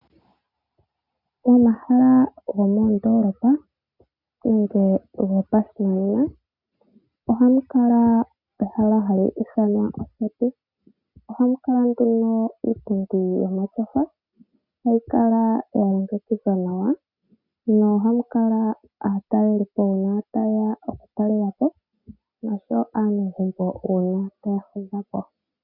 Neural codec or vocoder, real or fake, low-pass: none; real; 5.4 kHz